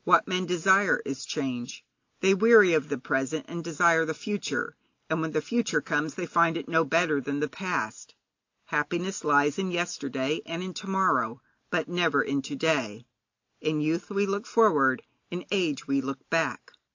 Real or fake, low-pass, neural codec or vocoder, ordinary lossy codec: real; 7.2 kHz; none; AAC, 48 kbps